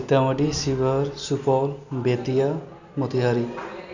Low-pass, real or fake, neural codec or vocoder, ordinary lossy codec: 7.2 kHz; real; none; none